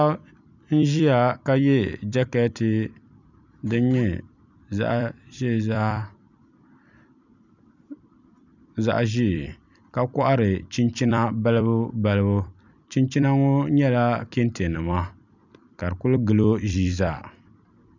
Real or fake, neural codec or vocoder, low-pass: fake; vocoder, 44.1 kHz, 128 mel bands every 256 samples, BigVGAN v2; 7.2 kHz